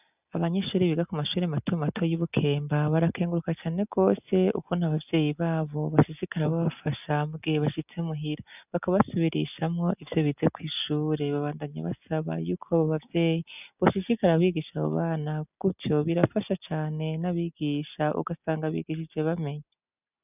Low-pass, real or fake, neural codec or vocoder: 3.6 kHz; real; none